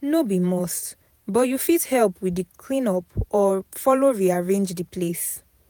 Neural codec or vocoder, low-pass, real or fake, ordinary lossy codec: vocoder, 48 kHz, 128 mel bands, Vocos; none; fake; none